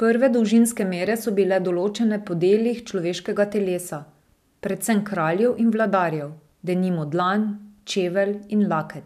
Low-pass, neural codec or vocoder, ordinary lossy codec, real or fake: 14.4 kHz; none; none; real